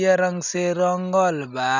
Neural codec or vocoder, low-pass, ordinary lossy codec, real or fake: none; 7.2 kHz; none; real